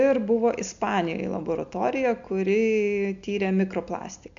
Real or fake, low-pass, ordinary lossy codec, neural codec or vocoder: real; 7.2 kHz; MP3, 64 kbps; none